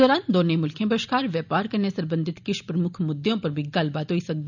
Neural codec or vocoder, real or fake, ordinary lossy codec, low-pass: none; real; none; 7.2 kHz